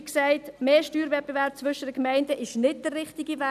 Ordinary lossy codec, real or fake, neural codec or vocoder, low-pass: none; real; none; 14.4 kHz